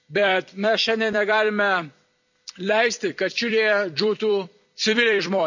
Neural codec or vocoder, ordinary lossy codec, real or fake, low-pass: vocoder, 44.1 kHz, 128 mel bands every 256 samples, BigVGAN v2; none; fake; 7.2 kHz